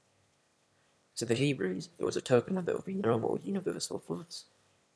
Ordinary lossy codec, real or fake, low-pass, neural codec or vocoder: none; fake; none; autoencoder, 22.05 kHz, a latent of 192 numbers a frame, VITS, trained on one speaker